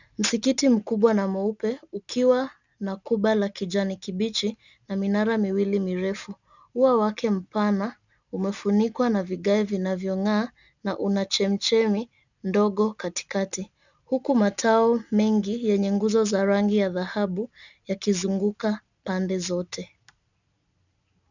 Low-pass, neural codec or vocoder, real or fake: 7.2 kHz; none; real